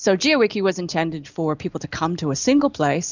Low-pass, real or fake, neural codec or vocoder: 7.2 kHz; real; none